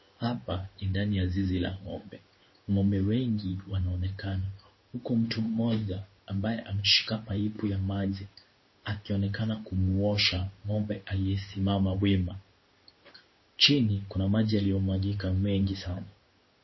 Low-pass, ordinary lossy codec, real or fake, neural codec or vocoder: 7.2 kHz; MP3, 24 kbps; fake; codec, 16 kHz in and 24 kHz out, 1 kbps, XY-Tokenizer